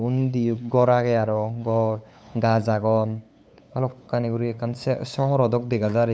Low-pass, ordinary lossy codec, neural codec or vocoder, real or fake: none; none; codec, 16 kHz, 8 kbps, FunCodec, trained on LibriTTS, 25 frames a second; fake